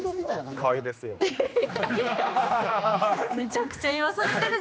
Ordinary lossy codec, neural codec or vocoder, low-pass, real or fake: none; codec, 16 kHz, 2 kbps, X-Codec, HuBERT features, trained on general audio; none; fake